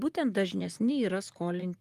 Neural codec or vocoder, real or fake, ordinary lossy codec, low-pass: vocoder, 44.1 kHz, 128 mel bands, Pupu-Vocoder; fake; Opus, 32 kbps; 14.4 kHz